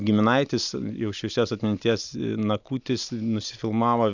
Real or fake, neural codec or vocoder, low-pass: real; none; 7.2 kHz